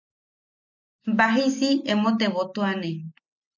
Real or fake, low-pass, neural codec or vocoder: real; 7.2 kHz; none